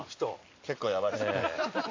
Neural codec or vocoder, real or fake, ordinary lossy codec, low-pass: none; real; MP3, 48 kbps; 7.2 kHz